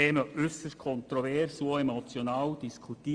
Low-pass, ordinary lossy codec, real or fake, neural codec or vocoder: 9.9 kHz; Opus, 32 kbps; real; none